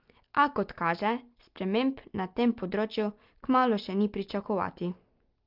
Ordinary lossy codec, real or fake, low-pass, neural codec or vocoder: Opus, 32 kbps; real; 5.4 kHz; none